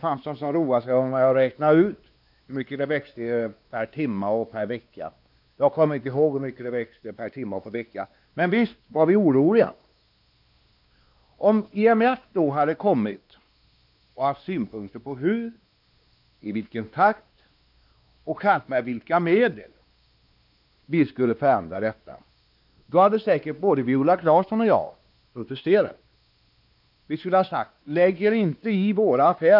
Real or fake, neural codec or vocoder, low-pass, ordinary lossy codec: fake; codec, 16 kHz, 2 kbps, X-Codec, WavLM features, trained on Multilingual LibriSpeech; 5.4 kHz; none